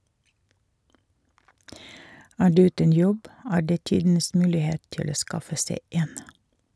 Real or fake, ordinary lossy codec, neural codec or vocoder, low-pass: real; none; none; none